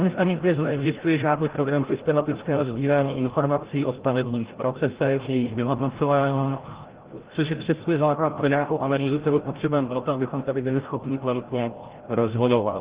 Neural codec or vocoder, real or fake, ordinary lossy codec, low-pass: codec, 16 kHz, 0.5 kbps, FreqCodec, larger model; fake; Opus, 16 kbps; 3.6 kHz